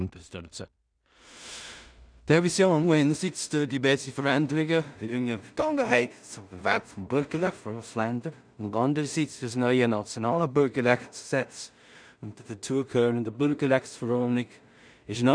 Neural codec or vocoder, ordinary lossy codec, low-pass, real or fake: codec, 16 kHz in and 24 kHz out, 0.4 kbps, LongCat-Audio-Codec, two codebook decoder; none; 9.9 kHz; fake